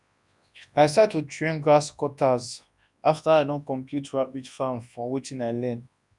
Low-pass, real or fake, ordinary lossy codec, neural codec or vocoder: 10.8 kHz; fake; none; codec, 24 kHz, 0.9 kbps, WavTokenizer, large speech release